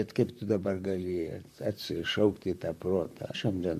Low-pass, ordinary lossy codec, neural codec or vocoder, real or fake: 14.4 kHz; MP3, 96 kbps; vocoder, 44.1 kHz, 128 mel bands, Pupu-Vocoder; fake